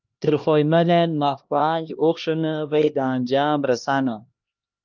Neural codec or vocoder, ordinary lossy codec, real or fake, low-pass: codec, 16 kHz, 1 kbps, X-Codec, HuBERT features, trained on LibriSpeech; Opus, 24 kbps; fake; 7.2 kHz